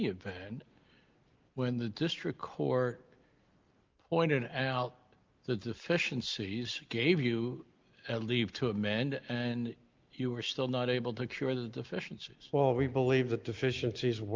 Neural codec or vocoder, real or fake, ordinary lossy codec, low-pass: none; real; Opus, 32 kbps; 7.2 kHz